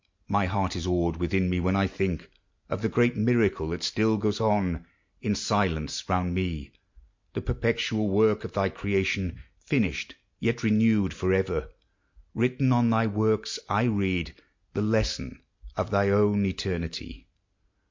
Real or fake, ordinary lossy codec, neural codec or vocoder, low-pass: real; MP3, 48 kbps; none; 7.2 kHz